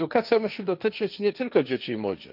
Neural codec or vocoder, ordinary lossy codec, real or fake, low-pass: codec, 16 kHz, 1.1 kbps, Voila-Tokenizer; none; fake; 5.4 kHz